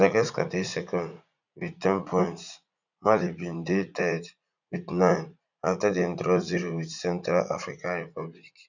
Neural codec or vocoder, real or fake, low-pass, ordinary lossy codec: vocoder, 44.1 kHz, 80 mel bands, Vocos; fake; 7.2 kHz; none